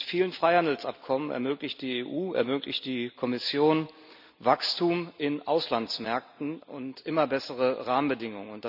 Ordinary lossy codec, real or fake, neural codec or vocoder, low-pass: none; real; none; 5.4 kHz